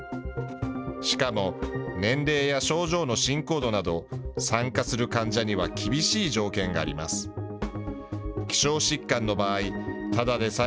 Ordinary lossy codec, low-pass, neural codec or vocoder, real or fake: none; none; none; real